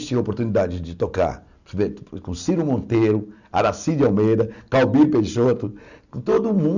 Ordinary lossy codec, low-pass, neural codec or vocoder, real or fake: none; 7.2 kHz; none; real